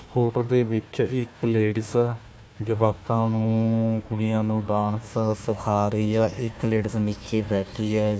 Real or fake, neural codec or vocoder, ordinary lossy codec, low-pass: fake; codec, 16 kHz, 1 kbps, FunCodec, trained on Chinese and English, 50 frames a second; none; none